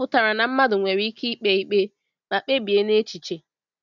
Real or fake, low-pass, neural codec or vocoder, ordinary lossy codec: real; 7.2 kHz; none; none